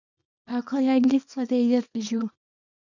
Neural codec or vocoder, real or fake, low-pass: codec, 24 kHz, 0.9 kbps, WavTokenizer, small release; fake; 7.2 kHz